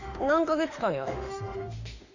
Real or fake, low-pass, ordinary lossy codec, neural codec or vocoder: fake; 7.2 kHz; none; autoencoder, 48 kHz, 32 numbers a frame, DAC-VAE, trained on Japanese speech